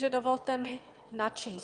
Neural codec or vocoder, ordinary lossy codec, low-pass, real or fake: autoencoder, 22.05 kHz, a latent of 192 numbers a frame, VITS, trained on one speaker; Opus, 32 kbps; 9.9 kHz; fake